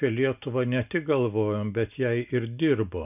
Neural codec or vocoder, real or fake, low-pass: none; real; 3.6 kHz